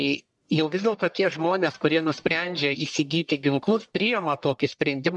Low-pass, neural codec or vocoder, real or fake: 10.8 kHz; codec, 44.1 kHz, 1.7 kbps, Pupu-Codec; fake